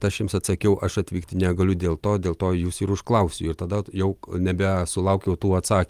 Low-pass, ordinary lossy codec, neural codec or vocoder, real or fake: 14.4 kHz; Opus, 32 kbps; none; real